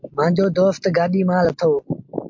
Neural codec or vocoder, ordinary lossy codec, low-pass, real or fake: none; MP3, 48 kbps; 7.2 kHz; real